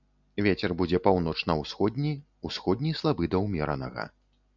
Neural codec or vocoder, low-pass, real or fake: none; 7.2 kHz; real